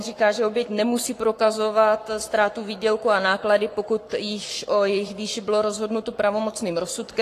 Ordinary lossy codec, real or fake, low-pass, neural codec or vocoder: AAC, 48 kbps; fake; 14.4 kHz; codec, 44.1 kHz, 7.8 kbps, Pupu-Codec